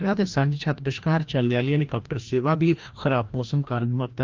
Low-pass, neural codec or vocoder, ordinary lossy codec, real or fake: 7.2 kHz; codec, 16 kHz, 1 kbps, FreqCodec, larger model; Opus, 24 kbps; fake